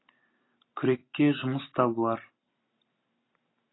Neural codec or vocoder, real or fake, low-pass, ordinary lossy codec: none; real; 7.2 kHz; AAC, 16 kbps